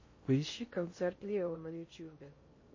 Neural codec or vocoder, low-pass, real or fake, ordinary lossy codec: codec, 16 kHz in and 24 kHz out, 0.6 kbps, FocalCodec, streaming, 4096 codes; 7.2 kHz; fake; MP3, 32 kbps